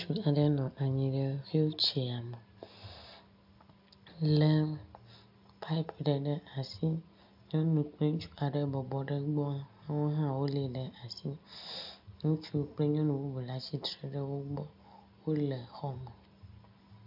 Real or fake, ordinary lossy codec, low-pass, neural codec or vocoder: real; MP3, 48 kbps; 5.4 kHz; none